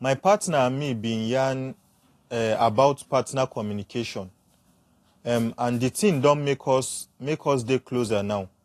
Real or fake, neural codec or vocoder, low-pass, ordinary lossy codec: real; none; 14.4 kHz; AAC, 48 kbps